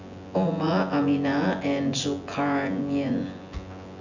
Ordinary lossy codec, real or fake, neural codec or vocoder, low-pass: none; fake; vocoder, 24 kHz, 100 mel bands, Vocos; 7.2 kHz